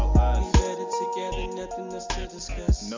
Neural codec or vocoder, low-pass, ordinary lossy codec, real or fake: none; 7.2 kHz; MP3, 64 kbps; real